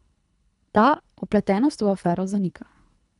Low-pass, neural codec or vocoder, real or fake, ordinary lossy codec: 10.8 kHz; codec, 24 kHz, 3 kbps, HILCodec; fake; none